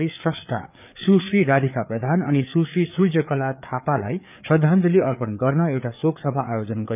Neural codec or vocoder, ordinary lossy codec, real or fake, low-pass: codec, 16 kHz, 4 kbps, FreqCodec, larger model; none; fake; 3.6 kHz